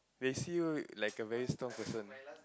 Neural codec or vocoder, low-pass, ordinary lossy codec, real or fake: none; none; none; real